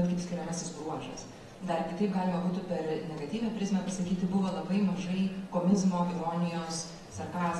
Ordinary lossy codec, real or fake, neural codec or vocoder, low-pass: AAC, 32 kbps; real; none; 19.8 kHz